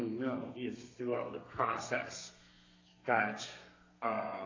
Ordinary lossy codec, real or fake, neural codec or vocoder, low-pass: AAC, 32 kbps; fake; codec, 32 kHz, 1.9 kbps, SNAC; 7.2 kHz